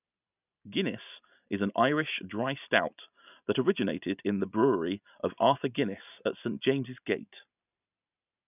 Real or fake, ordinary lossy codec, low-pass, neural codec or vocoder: real; none; 3.6 kHz; none